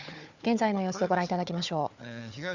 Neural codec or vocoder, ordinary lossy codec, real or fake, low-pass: codec, 16 kHz, 4 kbps, FunCodec, trained on Chinese and English, 50 frames a second; none; fake; 7.2 kHz